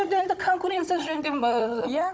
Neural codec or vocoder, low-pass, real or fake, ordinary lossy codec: codec, 16 kHz, 16 kbps, FunCodec, trained on Chinese and English, 50 frames a second; none; fake; none